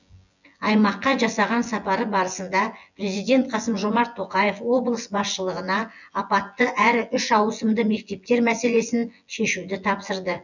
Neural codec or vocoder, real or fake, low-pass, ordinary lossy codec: vocoder, 24 kHz, 100 mel bands, Vocos; fake; 7.2 kHz; none